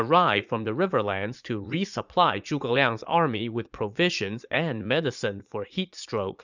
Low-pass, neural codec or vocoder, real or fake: 7.2 kHz; vocoder, 44.1 kHz, 80 mel bands, Vocos; fake